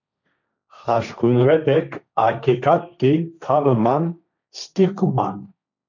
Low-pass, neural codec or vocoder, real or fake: 7.2 kHz; codec, 16 kHz, 1.1 kbps, Voila-Tokenizer; fake